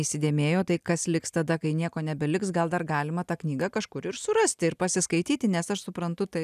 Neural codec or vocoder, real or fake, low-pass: none; real; 14.4 kHz